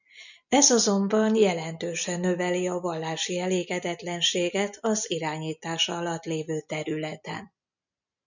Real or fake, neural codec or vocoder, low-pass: real; none; 7.2 kHz